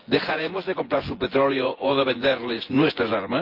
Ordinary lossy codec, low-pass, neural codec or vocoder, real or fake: Opus, 32 kbps; 5.4 kHz; vocoder, 24 kHz, 100 mel bands, Vocos; fake